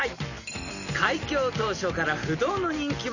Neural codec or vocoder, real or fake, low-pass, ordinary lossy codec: none; real; 7.2 kHz; none